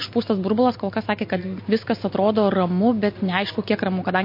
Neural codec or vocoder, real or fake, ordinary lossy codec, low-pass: none; real; MP3, 32 kbps; 5.4 kHz